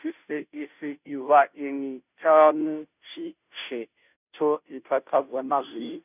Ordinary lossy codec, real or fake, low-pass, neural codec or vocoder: none; fake; 3.6 kHz; codec, 16 kHz, 0.5 kbps, FunCodec, trained on Chinese and English, 25 frames a second